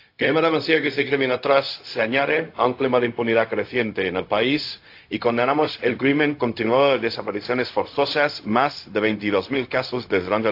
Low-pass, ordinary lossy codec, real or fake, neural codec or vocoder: 5.4 kHz; AAC, 32 kbps; fake; codec, 16 kHz, 0.4 kbps, LongCat-Audio-Codec